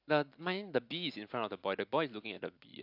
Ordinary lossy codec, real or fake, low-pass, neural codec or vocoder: AAC, 48 kbps; real; 5.4 kHz; none